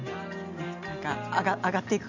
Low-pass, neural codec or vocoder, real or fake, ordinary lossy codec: 7.2 kHz; none; real; none